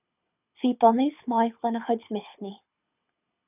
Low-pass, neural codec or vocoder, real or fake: 3.6 kHz; codec, 24 kHz, 6 kbps, HILCodec; fake